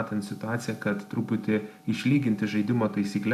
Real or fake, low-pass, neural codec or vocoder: real; 14.4 kHz; none